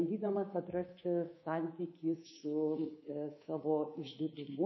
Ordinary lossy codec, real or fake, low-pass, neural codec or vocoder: MP3, 24 kbps; fake; 7.2 kHz; codec, 24 kHz, 3.1 kbps, DualCodec